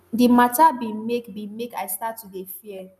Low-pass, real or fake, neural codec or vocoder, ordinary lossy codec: 14.4 kHz; real; none; none